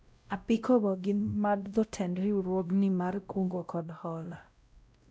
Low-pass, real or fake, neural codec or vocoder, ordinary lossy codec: none; fake; codec, 16 kHz, 0.5 kbps, X-Codec, WavLM features, trained on Multilingual LibriSpeech; none